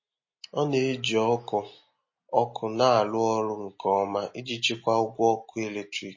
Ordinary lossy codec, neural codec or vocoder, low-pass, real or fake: MP3, 32 kbps; none; 7.2 kHz; real